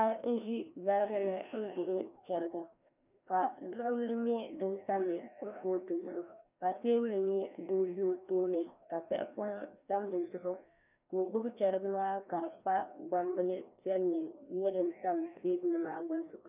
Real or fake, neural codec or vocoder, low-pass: fake; codec, 16 kHz, 1 kbps, FreqCodec, larger model; 3.6 kHz